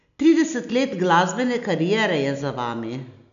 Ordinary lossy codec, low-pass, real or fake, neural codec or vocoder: none; 7.2 kHz; real; none